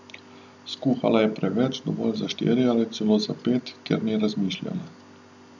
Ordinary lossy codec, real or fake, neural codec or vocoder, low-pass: none; real; none; 7.2 kHz